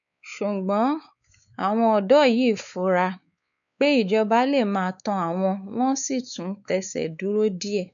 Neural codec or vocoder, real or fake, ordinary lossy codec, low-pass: codec, 16 kHz, 4 kbps, X-Codec, WavLM features, trained on Multilingual LibriSpeech; fake; none; 7.2 kHz